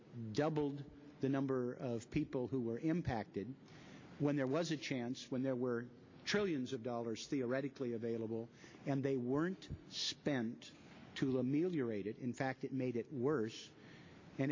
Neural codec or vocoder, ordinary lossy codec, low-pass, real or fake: none; MP3, 48 kbps; 7.2 kHz; real